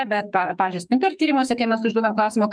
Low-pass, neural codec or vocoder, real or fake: 9.9 kHz; codec, 44.1 kHz, 2.6 kbps, SNAC; fake